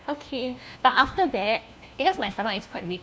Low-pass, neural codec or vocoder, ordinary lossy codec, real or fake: none; codec, 16 kHz, 1 kbps, FunCodec, trained on LibriTTS, 50 frames a second; none; fake